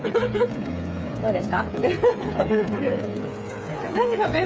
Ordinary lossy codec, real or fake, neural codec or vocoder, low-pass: none; fake; codec, 16 kHz, 8 kbps, FreqCodec, smaller model; none